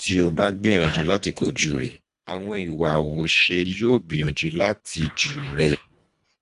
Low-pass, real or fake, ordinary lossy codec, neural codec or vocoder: 10.8 kHz; fake; none; codec, 24 kHz, 1.5 kbps, HILCodec